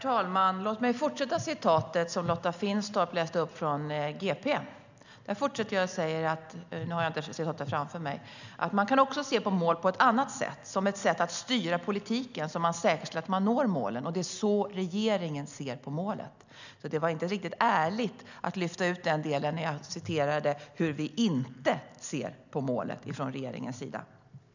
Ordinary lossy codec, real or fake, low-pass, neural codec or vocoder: none; real; 7.2 kHz; none